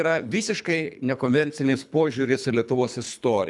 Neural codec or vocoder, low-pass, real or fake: codec, 24 kHz, 3 kbps, HILCodec; 10.8 kHz; fake